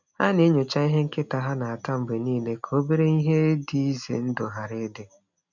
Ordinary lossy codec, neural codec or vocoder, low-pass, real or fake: none; none; 7.2 kHz; real